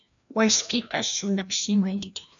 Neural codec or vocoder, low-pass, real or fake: codec, 16 kHz, 1 kbps, FreqCodec, larger model; 7.2 kHz; fake